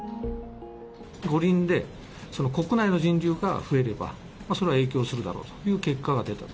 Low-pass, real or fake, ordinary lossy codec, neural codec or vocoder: none; real; none; none